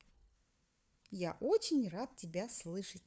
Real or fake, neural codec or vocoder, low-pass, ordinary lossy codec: fake; codec, 16 kHz, 4 kbps, FunCodec, trained on Chinese and English, 50 frames a second; none; none